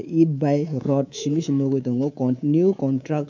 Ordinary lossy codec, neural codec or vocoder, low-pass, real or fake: MP3, 64 kbps; codec, 24 kHz, 3.1 kbps, DualCodec; 7.2 kHz; fake